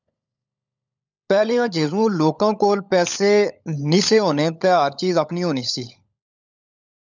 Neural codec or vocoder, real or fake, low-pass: codec, 16 kHz, 16 kbps, FunCodec, trained on LibriTTS, 50 frames a second; fake; 7.2 kHz